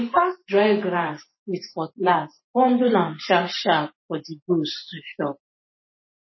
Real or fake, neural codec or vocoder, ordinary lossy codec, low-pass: fake; vocoder, 44.1 kHz, 128 mel bands every 512 samples, BigVGAN v2; MP3, 24 kbps; 7.2 kHz